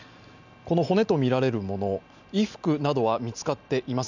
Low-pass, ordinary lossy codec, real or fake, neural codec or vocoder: 7.2 kHz; none; real; none